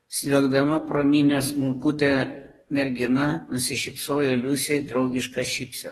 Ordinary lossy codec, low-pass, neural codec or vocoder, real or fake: AAC, 32 kbps; 19.8 kHz; codec, 44.1 kHz, 2.6 kbps, DAC; fake